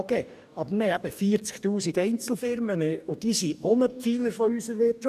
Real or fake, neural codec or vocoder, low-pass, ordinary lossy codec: fake; codec, 44.1 kHz, 2.6 kbps, DAC; 14.4 kHz; none